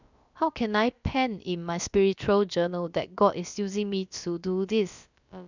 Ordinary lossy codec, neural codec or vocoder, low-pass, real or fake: none; codec, 16 kHz, about 1 kbps, DyCAST, with the encoder's durations; 7.2 kHz; fake